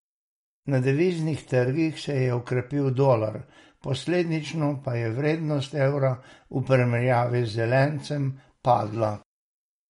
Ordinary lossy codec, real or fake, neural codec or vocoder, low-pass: MP3, 48 kbps; real; none; 10.8 kHz